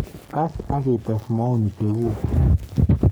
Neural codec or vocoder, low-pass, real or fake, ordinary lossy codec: codec, 44.1 kHz, 3.4 kbps, Pupu-Codec; none; fake; none